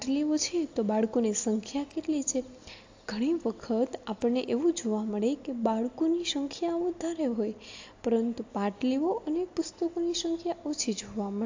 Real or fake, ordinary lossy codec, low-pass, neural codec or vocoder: real; AAC, 48 kbps; 7.2 kHz; none